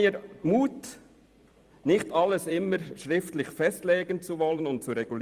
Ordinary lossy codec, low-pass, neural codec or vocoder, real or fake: Opus, 32 kbps; 14.4 kHz; vocoder, 44.1 kHz, 128 mel bands every 256 samples, BigVGAN v2; fake